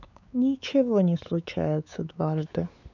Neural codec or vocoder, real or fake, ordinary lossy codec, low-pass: codec, 16 kHz, 4 kbps, X-Codec, WavLM features, trained on Multilingual LibriSpeech; fake; none; 7.2 kHz